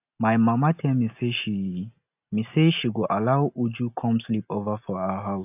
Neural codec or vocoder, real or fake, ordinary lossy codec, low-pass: none; real; AAC, 32 kbps; 3.6 kHz